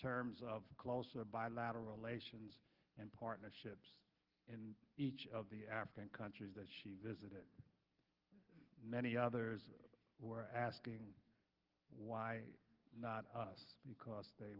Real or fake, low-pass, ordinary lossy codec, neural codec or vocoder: real; 5.4 kHz; Opus, 16 kbps; none